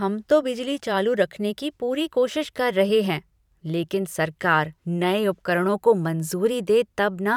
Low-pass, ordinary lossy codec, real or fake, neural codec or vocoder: 19.8 kHz; none; fake; vocoder, 44.1 kHz, 128 mel bands every 512 samples, BigVGAN v2